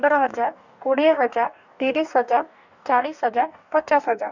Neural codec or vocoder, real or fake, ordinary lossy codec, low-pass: codec, 44.1 kHz, 2.6 kbps, DAC; fake; none; 7.2 kHz